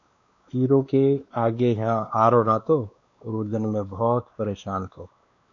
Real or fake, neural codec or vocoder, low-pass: fake; codec, 16 kHz, 2 kbps, X-Codec, WavLM features, trained on Multilingual LibriSpeech; 7.2 kHz